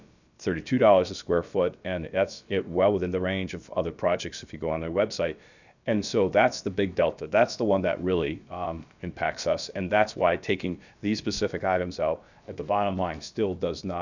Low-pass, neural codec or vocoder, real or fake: 7.2 kHz; codec, 16 kHz, about 1 kbps, DyCAST, with the encoder's durations; fake